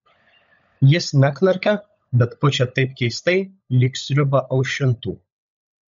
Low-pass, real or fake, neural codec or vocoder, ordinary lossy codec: 7.2 kHz; fake; codec, 16 kHz, 16 kbps, FunCodec, trained on LibriTTS, 50 frames a second; MP3, 48 kbps